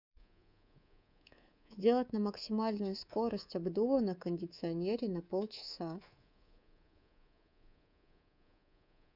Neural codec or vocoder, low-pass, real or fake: codec, 24 kHz, 3.1 kbps, DualCodec; 5.4 kHz; fake